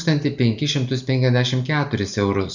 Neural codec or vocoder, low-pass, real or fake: none; 7.2 kHz; real